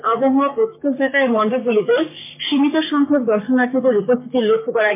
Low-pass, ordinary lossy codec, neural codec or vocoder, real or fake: 3.6 kHz; MP3, 24 kbps; codec, 44.1 kHz, 3.4 kbps, Pupu-Codec; fake